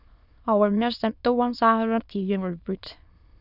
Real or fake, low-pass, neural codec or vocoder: fake; 5.4 kHz; autoencoder, 22.05 kHz, a latent of 192 numbers a frame, VITS, trained on many speakers